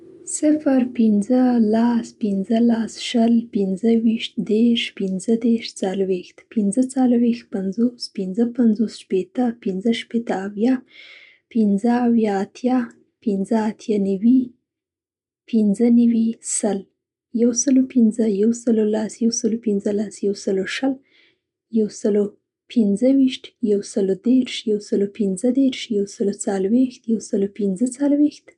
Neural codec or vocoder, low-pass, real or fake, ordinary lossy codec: none; 10.8 kHz; real; none